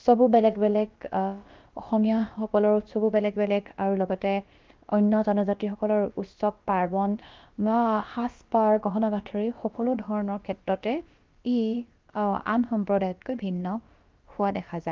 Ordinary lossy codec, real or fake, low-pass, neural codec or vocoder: Opus, 32 kbps; fake; 7.2 kHz; codec, 16 kHz, about 1 kbps, DyCAST, with the encoder's durations